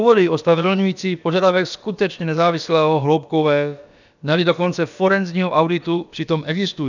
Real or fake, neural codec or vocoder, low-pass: fake; codec, 16 kHz, about 1 kbps, DyCAST, with the encoder's durations; 7.2 kHz